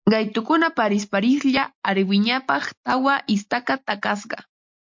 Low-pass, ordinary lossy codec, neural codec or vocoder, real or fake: 7.2 kHz; MP3, 48 kbps; none; real